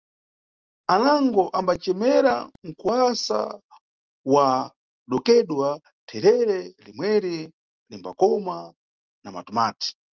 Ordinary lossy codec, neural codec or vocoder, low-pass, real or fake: Opus, 24 kbps; none; 7.2 kHz; real